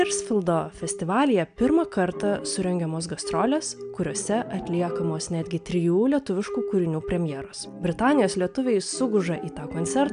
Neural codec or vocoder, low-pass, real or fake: none; 9.9 kHz; real